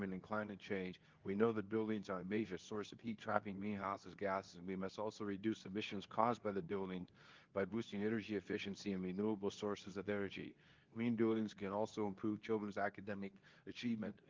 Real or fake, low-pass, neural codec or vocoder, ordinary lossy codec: fake; 7.2 kHz; codec, 24 kHz, 0.9 kbps, WavTokenizer, medium speech release version 2; Opus, 24 kbps